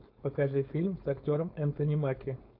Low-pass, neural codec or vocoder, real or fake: 5.4 kHz; codec, 16 kHz, 4.8 kbps, FACodec; fake